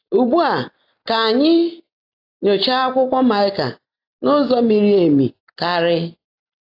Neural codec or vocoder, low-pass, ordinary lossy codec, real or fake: none; 5.4 kHz; AAC, 32 kbps; real